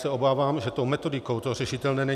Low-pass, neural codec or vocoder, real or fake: 14.4 kHz; none; real